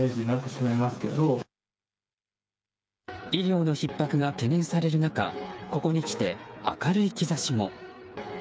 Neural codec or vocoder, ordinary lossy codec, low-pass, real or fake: codec, 16 kHz, 4 kbps, FreqCodec, smaller model; none; none; fake